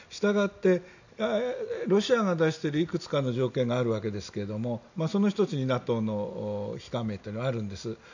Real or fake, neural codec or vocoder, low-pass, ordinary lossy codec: real; none; 7.2 kHz; none